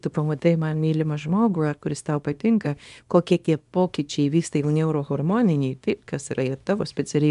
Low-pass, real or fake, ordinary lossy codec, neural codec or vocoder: 10.8 kHz; fake; MP3, 96 kbps; codec, 24 kHz, 0.9 kbps, WavTokenizer, small release